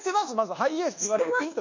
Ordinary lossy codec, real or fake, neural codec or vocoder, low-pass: none; fake; codec, 24 kHz, 1.2 kbps, DualCodec; 7.2 kHz